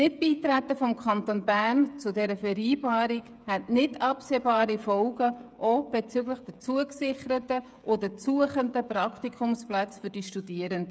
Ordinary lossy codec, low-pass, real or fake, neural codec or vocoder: none; none; fake; codec, 16 kHz, 16 kbps, FreqCodec, smaller model